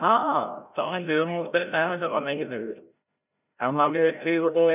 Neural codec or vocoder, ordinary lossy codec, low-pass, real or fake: codec, 16 kHz, 0.5 kbps, FreqCodec, larger model; none; 3.6 kHz; fake